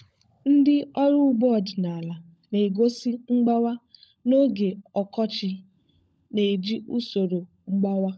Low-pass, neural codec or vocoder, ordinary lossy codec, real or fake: none; codec, 16 kHz, 16 kbps, FunCodec, trained on LibriTTS, 50 frames a second; none; fake